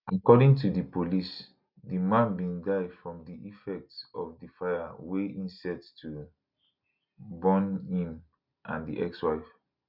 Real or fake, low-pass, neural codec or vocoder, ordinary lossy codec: real; 5.4 kHz; none; none